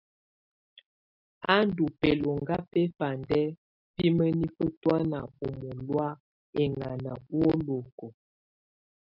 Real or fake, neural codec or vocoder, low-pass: real; none; 5.4 kHz